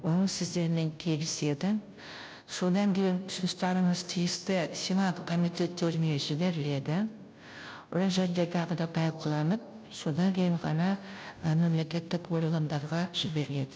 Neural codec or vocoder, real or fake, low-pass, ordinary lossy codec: codec, 16 kHz, 0.5 kbps, FunCodec, trained on Chinese and English, 25 frames a second; fake; none; none